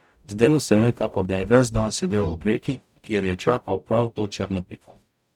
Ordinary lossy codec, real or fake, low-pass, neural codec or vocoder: none; fake; 19.8 kHz; codec, 44.1 kHz, 0.9 kbps, DAC